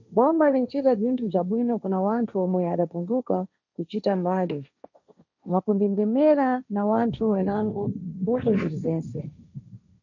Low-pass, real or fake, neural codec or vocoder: 7.2 kHz; fake; codec, 16 kHz, 1.1 kbps, Voila-Tokenizer